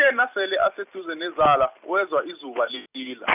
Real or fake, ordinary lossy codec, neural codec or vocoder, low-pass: real; none; none; 3.6 kHz